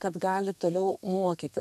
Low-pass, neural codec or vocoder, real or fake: 14.4 kHz; codec, 32 kHz, 1.9 kbps, SNAC; fake